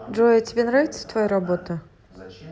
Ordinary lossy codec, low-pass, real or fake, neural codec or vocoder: none; none; real; none